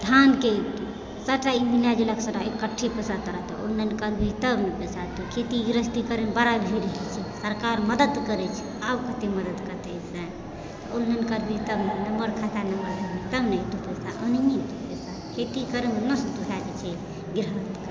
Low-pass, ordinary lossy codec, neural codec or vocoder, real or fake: none; none; none; real